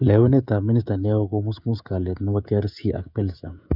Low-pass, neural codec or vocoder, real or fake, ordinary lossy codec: 5.4 kHz; codec, 16 kHz, 16 kbps, FreqCodec, smaller model; fake; none